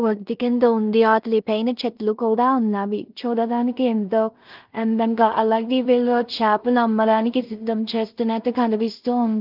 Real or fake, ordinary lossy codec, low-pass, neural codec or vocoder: fake; Opus, 24 kbps; 5.4 kHz; codec, 16 kHz in and 24 kHz out, 0.4 kbps, LongCat-Audio-Codec, two codebook decoder